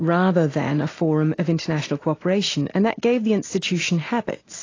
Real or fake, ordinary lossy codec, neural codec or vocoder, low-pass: real; AAC, 32 kbps; none; 7.2 kHz